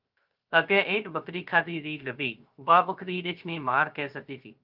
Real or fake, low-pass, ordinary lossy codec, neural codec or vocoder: fake; 5.4 kHz; Opus, 32 kbps; codec, 16 kHz, 0.3 kbps, FocalCodec